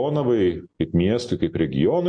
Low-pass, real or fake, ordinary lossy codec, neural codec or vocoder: 9.9 kHz; real; MP3, 48 kbps; none